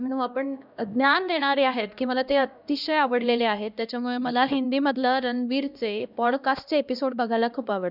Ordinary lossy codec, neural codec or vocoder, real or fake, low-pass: none; codec, 16 kHz, 1 kbps, X-Codec, HuBERT features, trained on LibriSpeech; fake; 5.4 kHz